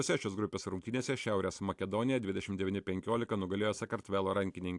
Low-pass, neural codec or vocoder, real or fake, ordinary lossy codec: 10.8 kHz; none; real; AAC, 64 kbps